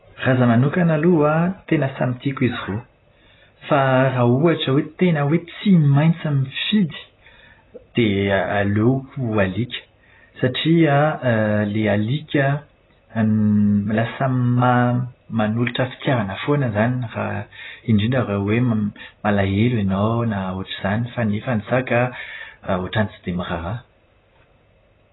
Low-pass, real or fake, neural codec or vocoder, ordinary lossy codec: 7.2 kHz; real; none; AAC, 16 kbps